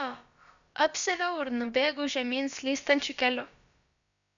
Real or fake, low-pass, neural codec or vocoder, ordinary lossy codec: fake; 7.2 kHz; codec, 16 kHz, about 1 kbps, DyCAST, with the encoder's durations; MP3, 96 kbps